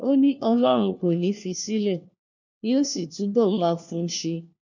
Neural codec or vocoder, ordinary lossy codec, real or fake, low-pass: codec, 16 kHz, 1 kbps, FunCodec, trained on LibriTTS, 50 frames a second; none; fake; 7.2 kHz